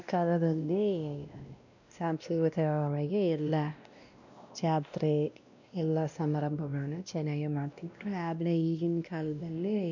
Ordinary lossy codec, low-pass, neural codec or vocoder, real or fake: none; 7.2 kHz; codec, 16 kHz, 1 kbps, X-Codec, WavLM features, trained on Multilingual LibriSpeech; fake